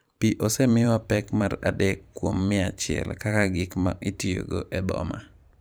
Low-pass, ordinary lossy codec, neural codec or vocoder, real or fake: none; none; vocoder, 44.1 kHz, 128 mel bands every 512 samples, BigVGAN v2; fake